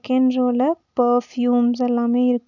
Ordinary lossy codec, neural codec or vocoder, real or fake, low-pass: none; none; real; 7.2 kHz